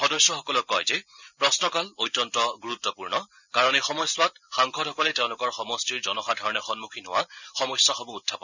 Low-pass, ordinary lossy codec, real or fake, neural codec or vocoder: 7.2 kHz; none; real; none